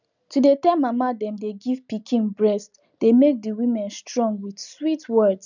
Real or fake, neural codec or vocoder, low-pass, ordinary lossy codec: real; none; 7.2 kHz; none